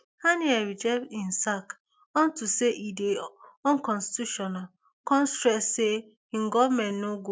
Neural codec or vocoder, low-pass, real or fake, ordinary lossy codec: none; none; real; none